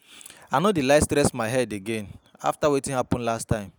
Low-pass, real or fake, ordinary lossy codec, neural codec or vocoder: none; real; none; none